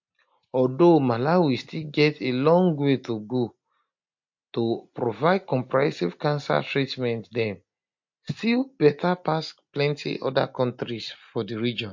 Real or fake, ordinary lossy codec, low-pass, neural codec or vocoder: real; MP3, 48 kbps; 7.2 kHz; none